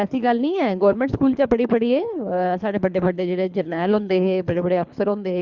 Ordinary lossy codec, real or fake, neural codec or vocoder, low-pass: Opus, 64 kbps; fake; codec, 24 kHz, 3 kbps, HILCodec; 7.2 kHz